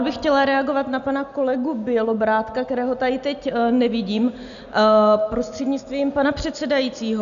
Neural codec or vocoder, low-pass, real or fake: none; 7.2 kHz; real